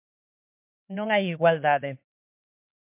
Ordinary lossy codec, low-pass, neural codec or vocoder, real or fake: MP3, 32 kbps; 3.6 kHz; codec, 16 kHz, 4 kbps, X-Codec, HuBERT features, trained on LibriSpeech; fake